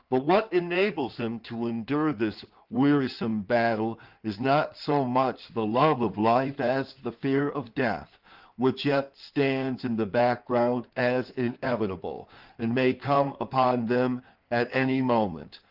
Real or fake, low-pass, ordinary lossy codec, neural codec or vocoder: fake; 5.4 kHz; Opus, 32 kbps; codec, 16 kHz in and 24 kHz out, 2.2 kbps, FireRedTTS-2 codec